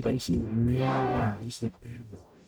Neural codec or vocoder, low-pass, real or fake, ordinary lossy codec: codec, 44.1 kHz, 0.9 kbps, DAC; none; fake; none